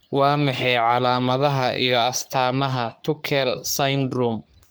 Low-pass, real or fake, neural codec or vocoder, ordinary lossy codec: none; fake; codec, 44.1 kHz, 3.4 kbps, Pupu-Codec; none